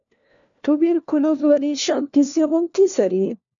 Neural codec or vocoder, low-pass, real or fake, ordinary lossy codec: codec, 16 kHz, 1 kbps, FunCodec, trained on LibriTTS, 50 frames a second; 7.2 kHz; fake; MP3, 96 kbps